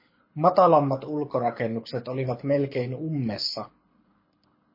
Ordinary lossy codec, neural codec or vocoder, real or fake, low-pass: MP3, 24 kbps; codec, 24 kHz, 6 kbps, HILCodec; fake; 5.4 kHz